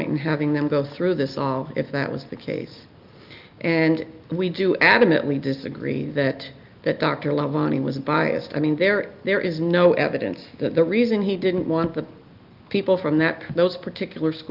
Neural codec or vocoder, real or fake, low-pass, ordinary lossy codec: none; real; 5.4 kHz; Opus, 32 kbps